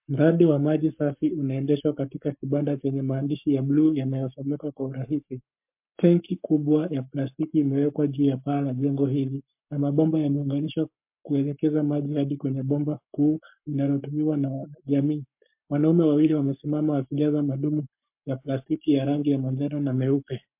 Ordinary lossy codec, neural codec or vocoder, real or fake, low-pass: MP3, 32 kbps; codec, 44.1 kHz, 7.8 kbps, Pupu-Codec; fake; 3.6 kHz